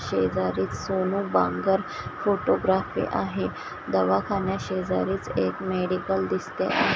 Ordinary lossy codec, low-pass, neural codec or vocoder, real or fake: none; none; none; real